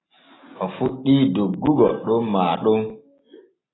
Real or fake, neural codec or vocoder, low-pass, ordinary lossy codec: real; none; 7.2 kHz; AAC, 16 kbps